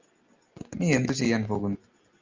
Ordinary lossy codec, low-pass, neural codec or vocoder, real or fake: Opus, 24 kbps; 7.2 kHz; none; real